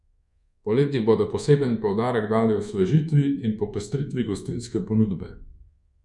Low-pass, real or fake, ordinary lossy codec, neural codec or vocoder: 10.8 kHz; fake; MP3, 96 kbps; codec, 24 kHz, 1.2 kbps, DualCodec